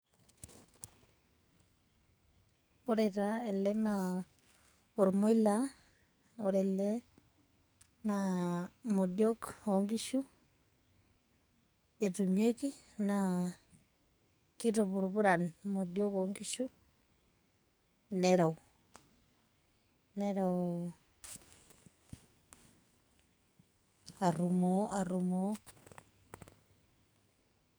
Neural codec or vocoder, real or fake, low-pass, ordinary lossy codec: codec, 44.1 kHz, 2.6 kbps, SNAC; fake; none; none